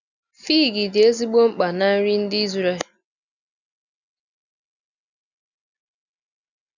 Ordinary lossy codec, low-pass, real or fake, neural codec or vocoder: none; 7.2 kHz; real; none